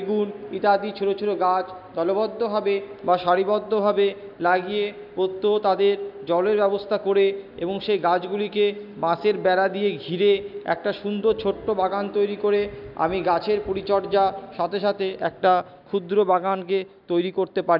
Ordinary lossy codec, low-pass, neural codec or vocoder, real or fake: none; 5.4 kHz; none; real